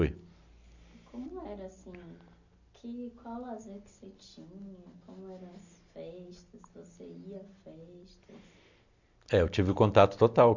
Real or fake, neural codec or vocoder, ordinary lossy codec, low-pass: real; none; none; 7.2 kHz